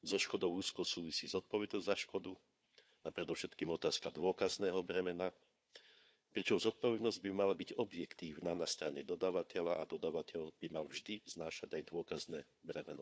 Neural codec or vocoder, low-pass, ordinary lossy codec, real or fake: codec, 16 kHz, 4 kbps, FunCodec, trained on Chinese and English, 50 frames a second; none; none; fake